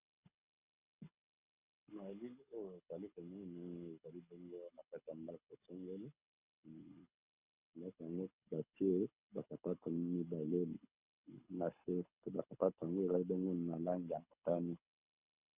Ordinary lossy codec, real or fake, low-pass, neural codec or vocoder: Opus, 64 kbps; fake; 3.6 kHz; codec, 24 kHz, 6 kbps, HILCodec